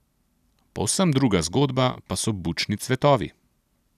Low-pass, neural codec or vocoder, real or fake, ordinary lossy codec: 14.4 kHz; none; real; none